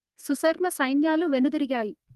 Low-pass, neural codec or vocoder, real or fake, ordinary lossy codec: 14.4 kHz; codec, 32 kHz, 1.9 kbps, SNAC; fake; Opus, 32 kbps